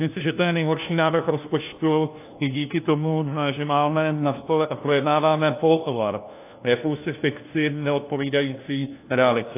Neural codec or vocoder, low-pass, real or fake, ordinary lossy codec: codec, 16 kHz, 1 kbps, FunCodec, trained on LibriTTS, 50 frames a second; 3.6 kHz; fake; AAC, 24 kbps